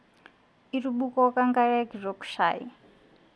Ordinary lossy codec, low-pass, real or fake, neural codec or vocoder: none; none; real; none